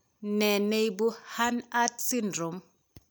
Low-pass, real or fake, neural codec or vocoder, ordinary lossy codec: none; real; none; none